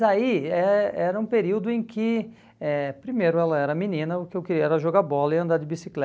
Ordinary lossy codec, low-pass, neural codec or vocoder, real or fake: none; none; none; real